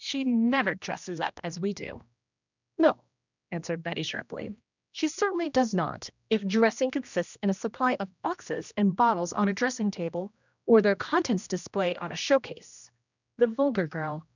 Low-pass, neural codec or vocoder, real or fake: 7.2 kHz; codec, 16 kHz, 1 kbps, X-Codec, HuBERT features, trained on general audio; fake